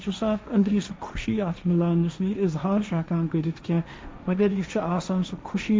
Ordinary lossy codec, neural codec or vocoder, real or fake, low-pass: none; codec, 16 kHz, 1.1 kbps, Voila-Tokenizer; fake; none